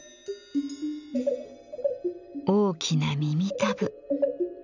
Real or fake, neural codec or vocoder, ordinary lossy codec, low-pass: real; none; none; 7.2 kHz